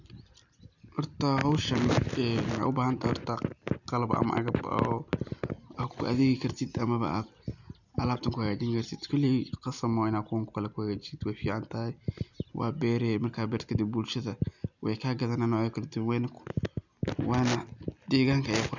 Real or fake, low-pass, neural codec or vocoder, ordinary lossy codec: real; 7.2 kHz; none; none